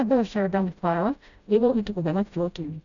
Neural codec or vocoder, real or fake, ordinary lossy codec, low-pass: codec, 16 kHz, 0.5 kbps, FreqCodec, smaller model; fake; none; 7.2 kHz